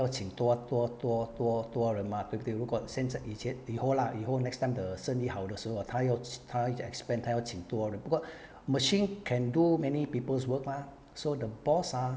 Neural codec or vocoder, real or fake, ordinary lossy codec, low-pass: none; real; none; none